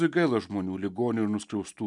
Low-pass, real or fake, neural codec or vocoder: 10.8 kHz; real; none